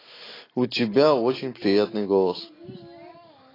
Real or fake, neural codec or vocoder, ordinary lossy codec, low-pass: real; none; AAC, 24 kbps; 5.4 kHz